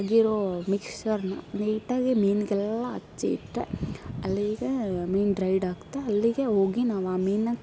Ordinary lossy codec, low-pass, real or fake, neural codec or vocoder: none; none; real; none